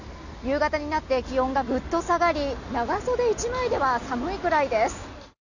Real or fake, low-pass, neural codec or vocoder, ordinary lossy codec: real; 7.2 kHz; none; none